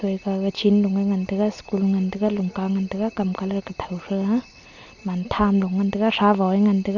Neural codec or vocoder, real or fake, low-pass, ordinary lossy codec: none; real; 7.2 kHz; none